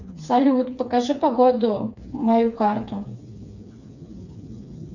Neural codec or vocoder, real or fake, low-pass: codec, 16 kHz, 4 kbps, FreqCodec, smaller model; fake; 7.2 kHz